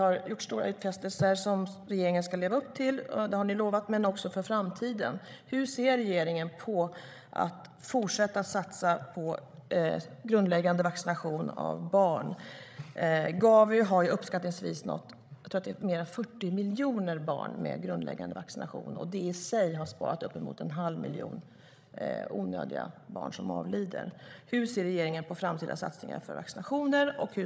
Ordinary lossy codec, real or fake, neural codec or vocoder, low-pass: none; fake; codec, 16 kHz, 16 kbps, FreqCodec, larger model; none